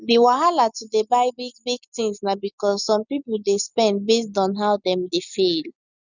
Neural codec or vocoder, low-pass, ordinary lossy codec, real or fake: none; 7.2 kHz; none; real